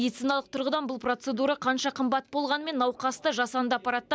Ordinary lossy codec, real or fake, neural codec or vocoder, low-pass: none; real; none; none